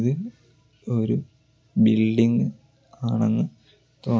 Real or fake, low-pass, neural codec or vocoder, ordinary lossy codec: real; none; none; none